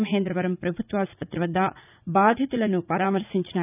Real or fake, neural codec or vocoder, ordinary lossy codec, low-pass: fake; vocoder, 44.1 kHz, 80 mel bands, Vocos; none; 3.6 kHz